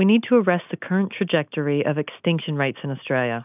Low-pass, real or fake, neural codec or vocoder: 3.6 kHz; real; none